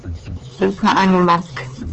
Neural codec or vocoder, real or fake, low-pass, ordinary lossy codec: codec, 16 kHz, 4 kbps, X-Codec, HuBERT features, trained on LibriSpeech; fake; 7.2 kHz; Opus, 16 kbps